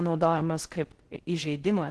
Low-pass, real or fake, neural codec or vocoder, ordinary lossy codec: 10.8 kHz; fake; codec, 16 kHz in and 24 kHz out, 0.6 kbps, FocalCodec, streaming, 4096 codes; Opus, 16 kbps